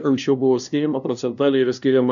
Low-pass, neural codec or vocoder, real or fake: 7.2 kHz; codec, 16 kHz, 0.5 kbps, FunCodec, trained on LibriTTS, 25 frames a second; fake